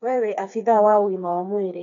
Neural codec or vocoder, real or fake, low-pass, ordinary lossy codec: codec, 16 kHz, 4 kbps, FreqCodec, smaller model; fake; 7.2 kHz; none